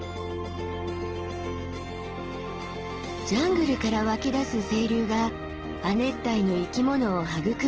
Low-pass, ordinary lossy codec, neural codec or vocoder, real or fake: 7.2 kHz; Opus, 16 kbps; none; real